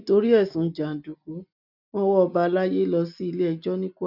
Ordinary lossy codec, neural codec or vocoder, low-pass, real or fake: none; none; 5.4 kHz; real